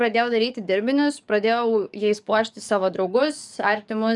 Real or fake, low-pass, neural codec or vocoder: fake; 10.8 kHz; codec, 44.1 kHz, 7.8 kbps, DAC